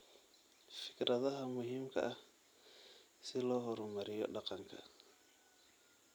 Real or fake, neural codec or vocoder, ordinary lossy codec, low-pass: fake; vocoder, 44.1 kHz, 128 mel bands every 256 samples, BigVGAN v2; none; none